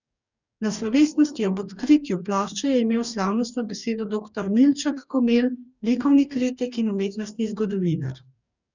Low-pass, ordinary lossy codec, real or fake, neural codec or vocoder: 7.2 kHz; none; fake; codec, 44.1 kHz, 2.6 kbps, DAC